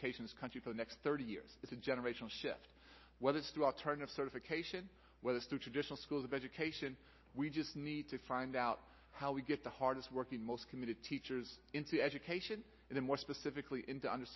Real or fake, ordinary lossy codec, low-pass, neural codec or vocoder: real; MP3, 24 kbps; 7.2 kHz; none